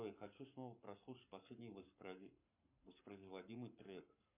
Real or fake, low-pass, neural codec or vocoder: fake; 3.6 kHz; codec, 44.1 kHz, 7.8 kbps, Pupu-Codec